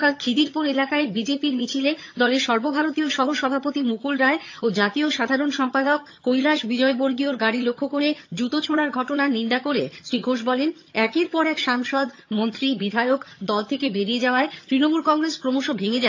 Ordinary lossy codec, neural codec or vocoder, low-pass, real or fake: AAC, 48 kbps; vocoder, 22.05 kHz, 80 mel bands, HiFi-GAN; 7.2 kHz; fake